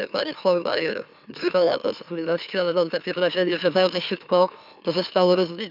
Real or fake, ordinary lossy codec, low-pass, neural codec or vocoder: fake; none; 5.4 kHz; autoencoder, 44.1 kHz, a latent of 192 numbers a frame, MeloTTS